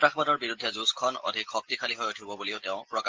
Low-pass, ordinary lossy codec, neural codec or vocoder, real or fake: 7.2 kHz; Opus, 16 kbps; none; real